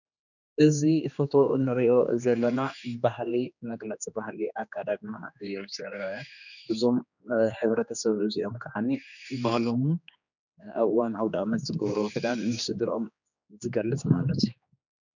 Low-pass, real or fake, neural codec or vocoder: 7.2 kHz; fake; codec, 16 kHz, 2 kbps, X-Codec, HuBERT features, trained on general audio